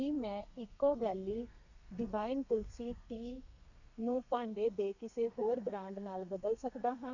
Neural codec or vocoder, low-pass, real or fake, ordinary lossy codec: codec, 32 kHz, 1.9 kbps, SNAC; 7.2 kHz; fake; none